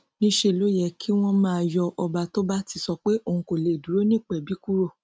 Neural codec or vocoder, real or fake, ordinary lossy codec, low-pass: none; real; none; none